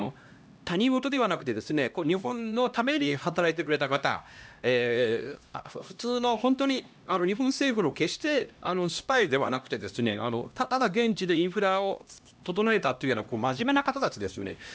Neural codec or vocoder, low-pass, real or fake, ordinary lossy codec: codec, 16 kHz, 1 kbps, X-Codec, HuBERT features, trained on LibriSpeech; none; fake; none